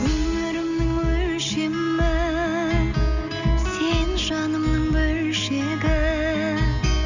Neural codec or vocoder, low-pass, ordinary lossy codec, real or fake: none; 7.2 kHz; none; real